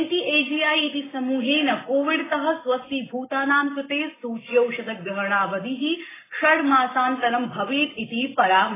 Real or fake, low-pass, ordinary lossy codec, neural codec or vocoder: real; 3.6 kHz; AAC, 16 kbps; none